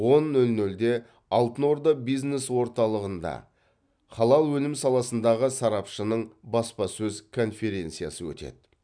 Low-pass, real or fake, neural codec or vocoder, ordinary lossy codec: 9.9 kHz; real; none; none